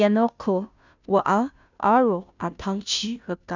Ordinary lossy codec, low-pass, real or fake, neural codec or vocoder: none; 7.2 kHz; fake; codec, 16 kHz, 0.5 kbps, FunCodec, trained on Chinese and English, 25 frames a second